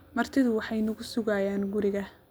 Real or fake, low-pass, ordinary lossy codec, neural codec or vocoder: real; none; none; none